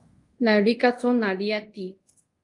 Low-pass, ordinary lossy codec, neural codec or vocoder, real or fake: 10.8 kHz; Opus, 32 kbps; codec, 24 kHz, 0.5 kbps, DualCodec; fake